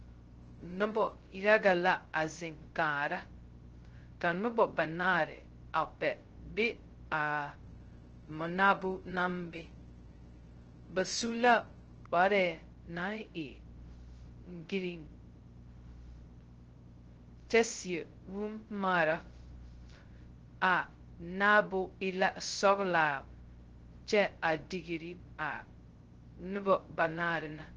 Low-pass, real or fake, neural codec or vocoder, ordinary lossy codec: 7.2 kHz; fake; codec, 16 kHz, 0.2 kbps, FocalCodec; Opus, 16 kbps